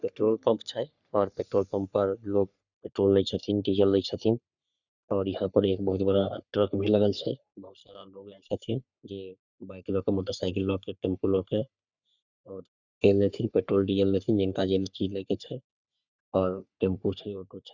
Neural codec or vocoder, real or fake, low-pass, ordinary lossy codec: codec, 44.1 kHz, 3.4 kbps, Pupu-Codec; fake; 7.2 kHz; none